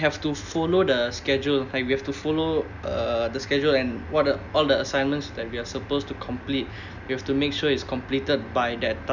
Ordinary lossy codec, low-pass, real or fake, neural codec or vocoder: none; 7.2 kHz; real; none